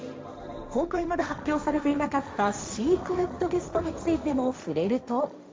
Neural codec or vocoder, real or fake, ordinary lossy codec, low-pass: codec, 16 kHz, 1.1 kbps, Voila-Tokenizer; fake; none; none